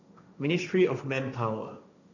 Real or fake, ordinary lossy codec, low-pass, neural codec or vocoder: fake; none; 7.2 kHz; codec, 16 kHz, 1.1 kbps, Voila-Tokenizer